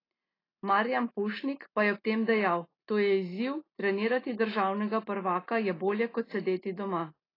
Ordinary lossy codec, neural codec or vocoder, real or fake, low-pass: AAC, 24 kbps; none; real; 5.4 kHz